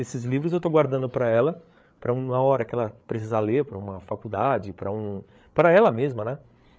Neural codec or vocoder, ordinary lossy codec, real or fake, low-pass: codec, 16 kHz, 8 kbps, FreqCodec, larger model; none; fake; none